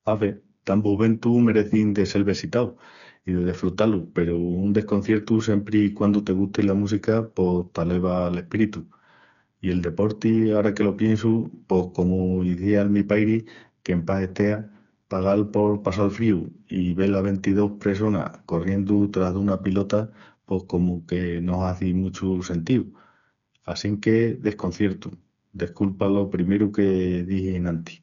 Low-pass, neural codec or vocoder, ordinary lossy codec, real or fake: 7.2 kHz; codec, 16 kHz, 4 kbps, FreqCodec, smaller model; none; fake